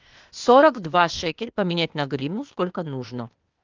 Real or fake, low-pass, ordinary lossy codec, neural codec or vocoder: fake; 7.2 kHz; Opus, 32 kbps; codec, 16 kHz, 0.8 kbps, ZipCodec